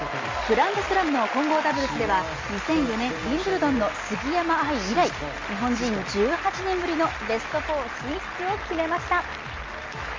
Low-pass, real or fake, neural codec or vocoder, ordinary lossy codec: 7.2 kHz; real; none; Opus, 32 kbps